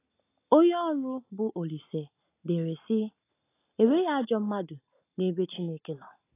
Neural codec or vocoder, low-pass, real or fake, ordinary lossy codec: none; 3.6 kHz; real; AAC, 24 kbps